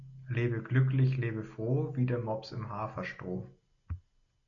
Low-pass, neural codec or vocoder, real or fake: 7.2 kHz; none; real